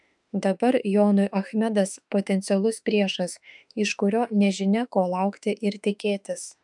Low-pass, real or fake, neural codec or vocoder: 10.8 kHz; fake; autoencoder, 48 kHz, 32 numbers a frame, DAC-VAE, trained on Japanese speech